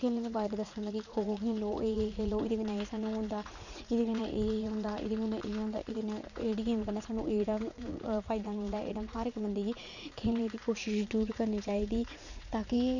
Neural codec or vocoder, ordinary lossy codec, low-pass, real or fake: vocoder, 44.1 kHz, 80 mel bands, Vocos; none; 7.2 kHz; fake